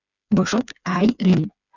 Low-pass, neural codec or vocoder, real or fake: 7.2 kHz; codec, 16 kHz, 4 kbps, FreqCodec, smaller model; fake